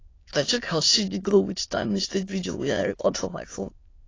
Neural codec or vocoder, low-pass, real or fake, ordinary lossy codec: autoencoder, 22.05 kHz, a latent of 192 numbers a frame, VITS, trained on many speakers; 7.2 kHz; fake; AAC, 32 kbps